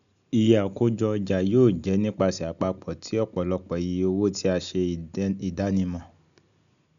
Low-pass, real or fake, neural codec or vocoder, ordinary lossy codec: 7.2 kHz; real; none; none